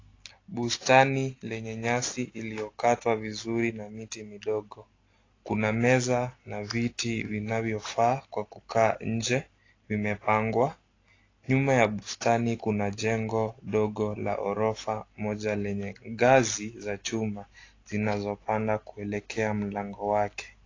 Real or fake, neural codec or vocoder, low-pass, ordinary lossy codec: real; none; 7.2 kHz; AAC, 32 kbps